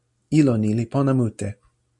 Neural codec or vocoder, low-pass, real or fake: none; 10.8 kHz; real